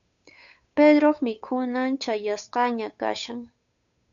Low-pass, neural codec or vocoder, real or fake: 7.2 kHz; codec, 16 kHz, 8 kbps, FunCodec, trained on Chinese and English, 25 frames a second; fake